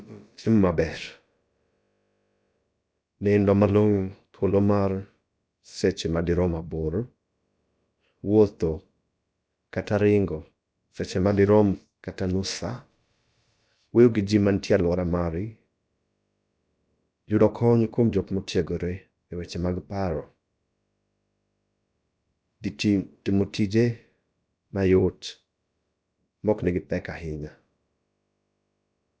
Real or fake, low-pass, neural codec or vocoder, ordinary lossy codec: fake; none; codec, 16 kHz, about 1 kbps, DyCAST, with the encoder's durations; none